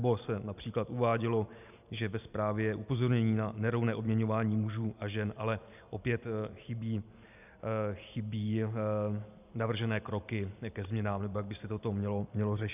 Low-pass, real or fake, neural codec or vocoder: 3.6 kHz; real; none